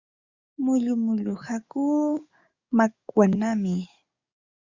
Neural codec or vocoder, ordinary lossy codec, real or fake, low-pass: codec, 44.1 kHz, 7.8 kbps, DAC; Opus, 64 kbps; fake; 7.2 kHz